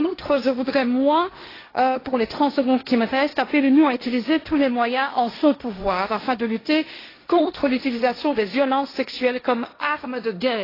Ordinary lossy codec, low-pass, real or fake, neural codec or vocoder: AAC, 24 kbps; 5.4 kHz; fake; codec, 16 kHz, 1.1 kbps, Voila-Tokenizer